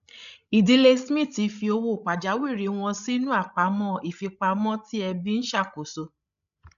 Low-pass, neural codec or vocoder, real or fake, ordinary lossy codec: 7.2 kHz; codec, 16 kHz, 16 kbps, FreqCodec, larger model; fake; none